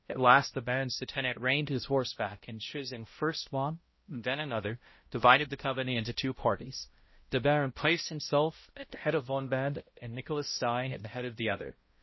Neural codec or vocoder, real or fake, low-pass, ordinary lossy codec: codec, 16 kHz, 0.5 kbps, X-Codec, HuBERT features, trained on balanced general audio; fake; 7.2 kHz; MP3, 24 kbps